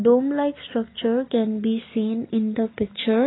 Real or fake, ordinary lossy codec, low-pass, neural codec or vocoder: real; AAC, 16 kbps; 7.2 kHz; none